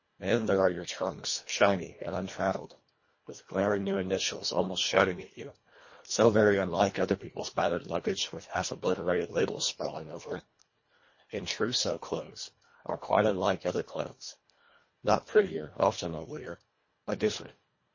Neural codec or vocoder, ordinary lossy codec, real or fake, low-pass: codec, 24 kHz, 1.5 kbps, HILCodec; MP3, 32 kbps; fake; 7.2 kHz